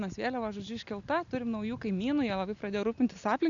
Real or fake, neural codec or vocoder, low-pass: real; none; 7.2 kHz